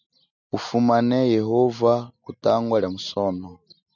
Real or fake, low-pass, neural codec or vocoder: real; 7.2 kHz; none